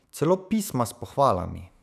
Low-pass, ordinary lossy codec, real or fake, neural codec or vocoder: 14.4 kHz; none; fake; autoencoder, 48 kHz, 128 numbers a frame, DAC-VAE, trained on Japanese speech